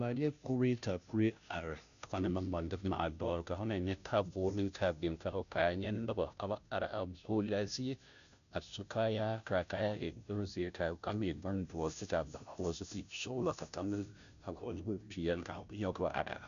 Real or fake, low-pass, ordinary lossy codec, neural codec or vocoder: fake; 7.2 kHz; MP3, 96 kbps; codec, 16 kHz, 0.5 kbps, FunCodec, trained on Chinese and English, 25 frames a second